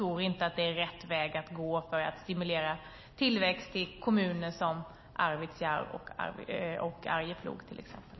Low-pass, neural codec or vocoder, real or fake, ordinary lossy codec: 7.2 kHz; none; real; MP3, 24 kbps